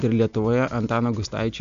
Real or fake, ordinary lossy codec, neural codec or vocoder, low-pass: real; AAC, 64 kbps; none; 7.2 kHz